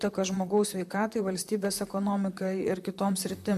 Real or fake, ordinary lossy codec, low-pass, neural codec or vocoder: fake; MP3, 96 kbps; 14.4 kHz; vocoder, 44.1 kHz, 128 mel bands, Pupu-Vocoder